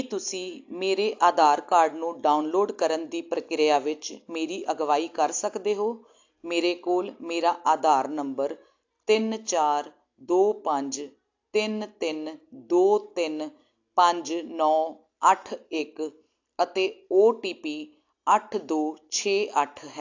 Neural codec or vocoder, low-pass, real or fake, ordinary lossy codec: none; 7.2 kHz; real; AAC, 48 kbps